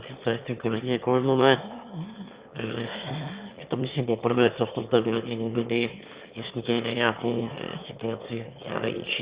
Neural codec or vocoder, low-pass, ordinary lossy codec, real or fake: autoencoder, 22.05 kHz, a latent of 192 numbers a frame, VITS, trained on one speaker; 3.6 kHz; Opus, 64 kbps; fake